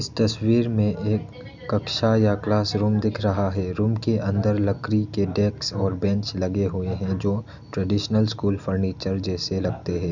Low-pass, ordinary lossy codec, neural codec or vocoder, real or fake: 7.2 kHz; none; none; real